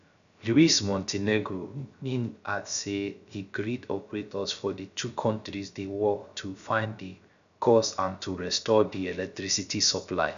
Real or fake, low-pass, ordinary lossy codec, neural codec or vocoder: fake; 7.2 kHz; none; codec, 16 kHz, 0.3 kbps, FocalCodec